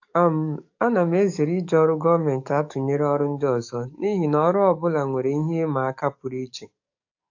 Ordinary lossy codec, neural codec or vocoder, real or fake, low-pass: AAC, 48 kbps; codec, 44.1 kHz, 7.8 kbps, DAC; fake; 7.2 kHz